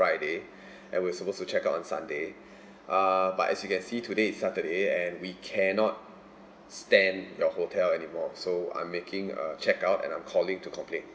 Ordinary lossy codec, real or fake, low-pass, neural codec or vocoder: none; real; none; none